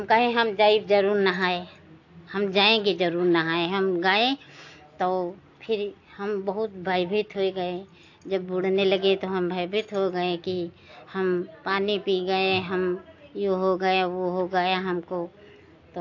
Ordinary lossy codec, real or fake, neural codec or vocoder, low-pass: AAC, 48 kbps; real; none; 7.2 kHz